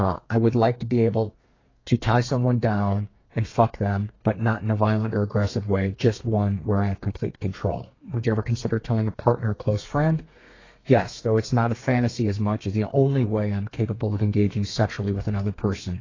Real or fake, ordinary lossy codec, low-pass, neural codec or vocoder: fake; AAC, 32 kbps; 7.2 kHz; codec, 32 kHz, 1.9 kbps, SNAC